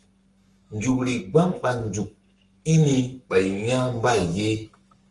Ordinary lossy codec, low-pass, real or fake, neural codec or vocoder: Opus, 32 kbps; 10.8 kHz; fake; codec, 44.1 kHz, 7.8 kbps, Pupu-Codec